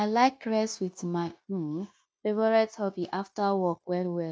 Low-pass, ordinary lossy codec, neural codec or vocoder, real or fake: none; none; codec, 16 kHz, 0.9 kbps, LongCat-Audio-Codec; fake